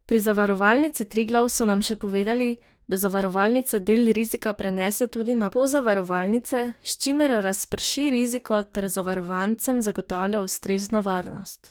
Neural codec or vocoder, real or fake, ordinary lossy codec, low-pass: codec, 44.1 kHz, 2.6 kbps, DAC; fake; none; none